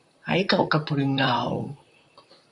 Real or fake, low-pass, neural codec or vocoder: fake; 10.8 kHz; vocoder, 44.1 kHz, 128 mel bands, Pupu-Vocoder